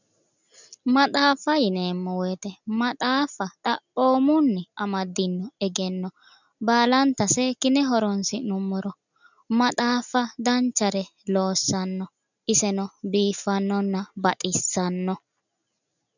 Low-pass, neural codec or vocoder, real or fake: 7.2 kHz; none; real